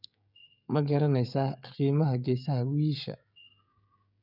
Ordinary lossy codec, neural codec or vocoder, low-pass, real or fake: none; codec, 16 kHz, 16 kbps, FreqCodec, smaller model; 5.4 kHz; fake